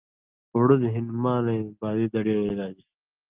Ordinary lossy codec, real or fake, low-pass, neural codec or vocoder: Opus, 24 kbps; real; 3.6 kHz; none